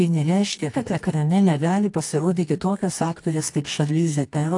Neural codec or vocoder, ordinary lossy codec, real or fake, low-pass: codec, 24 kHz, 0.9 kbps, WavTokenizer, medium music audio release; AAC, 64 kbps; fake; 10.8 kHz